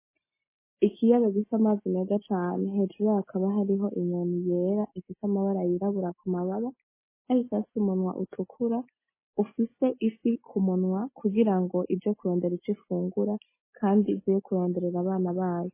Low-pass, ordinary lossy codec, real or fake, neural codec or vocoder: 3.6 kHz; MP3, 16 kbps; real; none